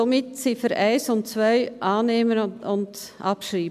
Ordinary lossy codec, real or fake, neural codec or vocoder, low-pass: MP3, 96 kbps; real; none; 14.4 kHz